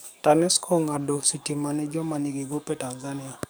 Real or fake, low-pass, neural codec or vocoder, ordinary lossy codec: fake; none; codec, 44.1 kHz, 7.8 kbps, DAC; none